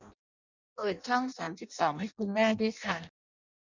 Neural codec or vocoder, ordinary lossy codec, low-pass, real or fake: codec, 16 kHz in and 24 kHz out, 0.6 kbps, FireRedTTS-2 codec; none; 7.2 kHz; fake